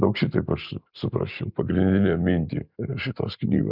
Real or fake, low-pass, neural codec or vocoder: real; 5.4 kHz; none